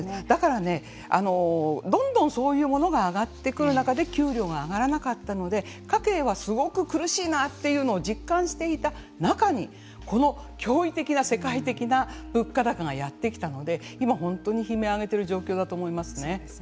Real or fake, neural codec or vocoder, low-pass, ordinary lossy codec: real; none; none; none